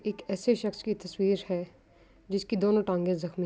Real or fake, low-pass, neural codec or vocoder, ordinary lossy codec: real; none; none; none